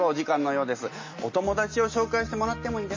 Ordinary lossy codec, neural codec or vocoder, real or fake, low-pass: MP3, 32 kbps; none; real; 7.2 kHz